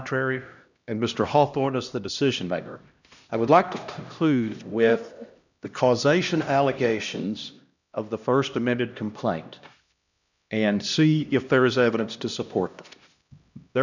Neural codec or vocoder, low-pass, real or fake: codec, 16 kHz, 1 kbps, X-Codec, HuBERT features, trained on LibriSpeech; 7.2 kHz; fake